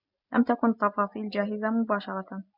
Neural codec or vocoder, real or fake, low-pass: none; real; 5.4 kHz